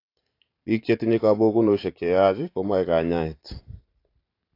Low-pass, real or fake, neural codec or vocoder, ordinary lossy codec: 5.4 kHz; fake; vocoder, 44.1 kHz, 128 mel bands every 512 samples, BigVGAN v2; AAC, 32 kbps